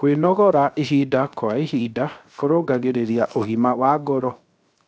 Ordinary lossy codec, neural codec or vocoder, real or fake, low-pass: none; codec, 16 kHz, 0.7 kbps, FocalCodec; fake; none